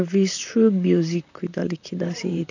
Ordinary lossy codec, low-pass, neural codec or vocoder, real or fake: none; 7.2 kHz; vocoder, 44.1 kHz, 128 mel bands, Pupu-Vocoder; fake